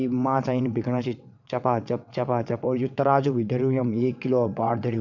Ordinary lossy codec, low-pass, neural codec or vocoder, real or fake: none; 7.2 kHz; vocoder, 22.05 kHz, 80 mel bands, WaveNeXt; fake